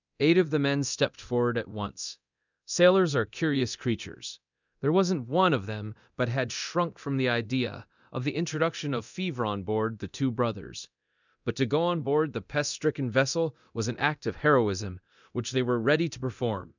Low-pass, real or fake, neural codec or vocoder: 7.2 kHz; fake; codec, 24 kHz, 0.9 kbps, DualCodec